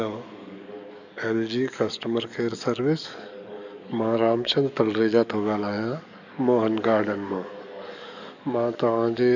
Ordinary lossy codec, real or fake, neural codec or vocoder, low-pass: none; fake; codec, 44.1 kHz, 7.8 kbps, DAC; 7.2 kHz